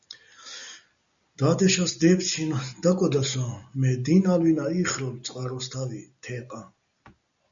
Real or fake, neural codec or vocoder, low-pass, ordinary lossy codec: real; none; 7.2 kHz; MP3, 64 kbps